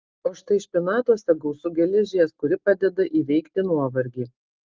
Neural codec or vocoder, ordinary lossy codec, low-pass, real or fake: none; Opus, 32 kbps; 7.2 kHz; real